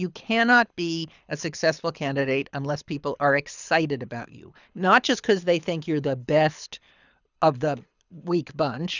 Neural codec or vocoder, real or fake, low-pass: codec, 24 kHz, 6 kbps, HILCodec; fake; 7.2 kHz